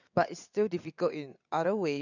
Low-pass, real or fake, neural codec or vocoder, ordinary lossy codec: 7.2 kHz; fake; vocoder, 22.05 kHz, 80 mel bands, WaveNeXt; none